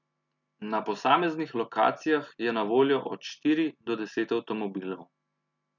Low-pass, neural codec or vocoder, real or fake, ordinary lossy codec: 7.2 kHz; none; real; none